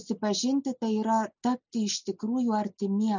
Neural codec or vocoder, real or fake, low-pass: none; real; 7.2 kHz